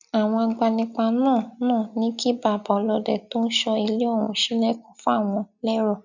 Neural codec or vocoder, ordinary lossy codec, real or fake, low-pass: none; none; real; 7.2 kHz